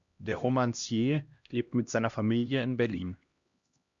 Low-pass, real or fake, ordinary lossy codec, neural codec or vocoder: 7.2 kHz; fake; Opus, 64 kbps; codec, 16 kHz, 1 kbps, X-Codec, HuBERT features, trained on LibriSpeech